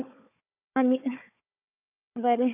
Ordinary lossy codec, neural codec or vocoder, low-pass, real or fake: none; codec, 16 kHz, 4 kbps, FunCodec, trained on Chinese and English, 50 frames a second; 3.6 kHz; fake